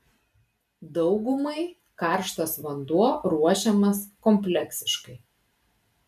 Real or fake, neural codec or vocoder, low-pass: real; none; 14.4 kHz